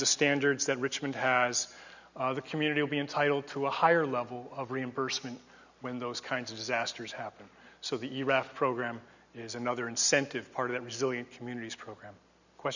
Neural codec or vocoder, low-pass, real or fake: none; 7.2 kHz; real